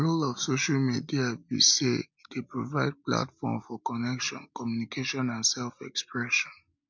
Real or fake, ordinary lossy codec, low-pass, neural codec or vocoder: real; MP3, 64 kbps; 7.2 kHz; none